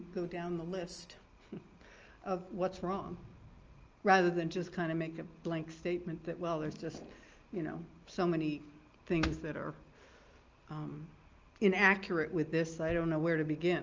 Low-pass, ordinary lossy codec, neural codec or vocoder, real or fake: 7.2 kHz; Opus, 24 kbps; none; real